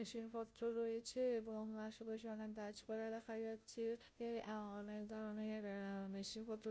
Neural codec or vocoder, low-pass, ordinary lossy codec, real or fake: codec, 16 kHz, 0.5 kbps, FunCodec, trained on Chinese and English, 25 frames a second; none; none; fake